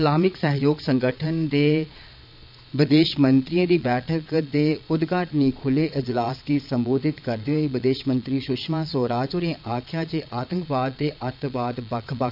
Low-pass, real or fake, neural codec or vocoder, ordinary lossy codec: 5.4 kHz; fake; vocoder, 22.05 kHz, 80 mel bands, Vocos; none